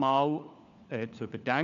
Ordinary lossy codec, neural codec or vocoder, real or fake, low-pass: none; codec, 16 kHz, 4 kbps, FunCodec, trained on LibriTTS, 50 frames a second; fake; 7.2 kHz